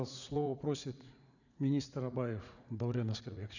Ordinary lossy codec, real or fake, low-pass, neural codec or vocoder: none; fake; 7.2 kHz; vocoder, 44.1 kHz, 80 mel bands, Vocos